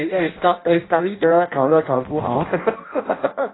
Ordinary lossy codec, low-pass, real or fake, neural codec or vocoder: AAC, 16 kbps; 7.2 kHz; fake; codec, 16 kHz in and 24 kHz out, 0.6 kbps, FireRedTTS-2 codec